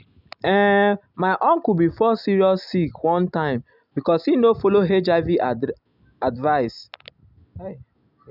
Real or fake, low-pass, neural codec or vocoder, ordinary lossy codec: real; 5.4 kHz; none; none